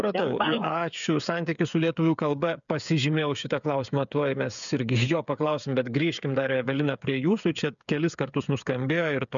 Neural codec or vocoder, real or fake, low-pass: codec, 16 kHz, 8 kbps, FreqCodec, smaller model; fake; 7.2 kHz